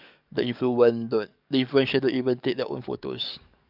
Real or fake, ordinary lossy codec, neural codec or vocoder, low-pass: fake; none; codec, 44.1 kHz, 7.8 kbps, DAC; 5.4 kHz